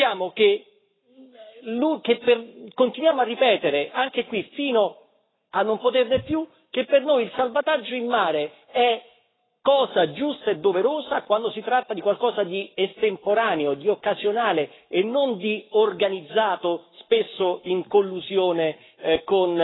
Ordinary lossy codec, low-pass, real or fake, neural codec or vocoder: AAC, 16 kbps; 7.2 kHz; fake; codec, 44.1 kHz, 7.8 kbps, Pupu-Codec